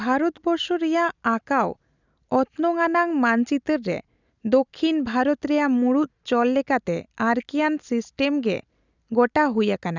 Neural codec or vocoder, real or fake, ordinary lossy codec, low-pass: none; real; none; 7.2 kHz